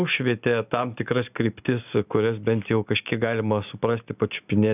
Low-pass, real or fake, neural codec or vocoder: 3.6 kHz; real; none